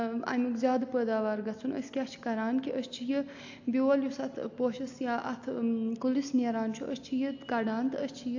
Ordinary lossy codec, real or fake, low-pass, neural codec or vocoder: AAC, 48 kbps; real; 7.2 kHz; none